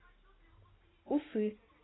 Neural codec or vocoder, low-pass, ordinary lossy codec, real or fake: none; 7.2 kHz; AAC, 16 kbps; real